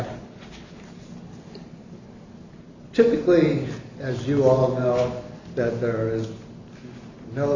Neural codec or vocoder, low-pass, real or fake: none; 7.2 kHz; real